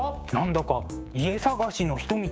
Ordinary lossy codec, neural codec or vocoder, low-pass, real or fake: none; codec, 16 kHz, 6 kbps, DAC; none; fake